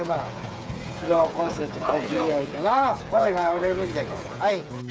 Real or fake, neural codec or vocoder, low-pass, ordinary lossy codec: fake; codec, 16 kHz, 8 kbps, FreqCodec, smaller model; none; none